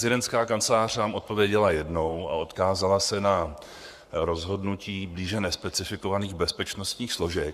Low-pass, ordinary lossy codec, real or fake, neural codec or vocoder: 14.4 kHz; Opus, 64 kbps; fake; codec, 44.1 kHz, 7.8 kbps, Pupu-Codec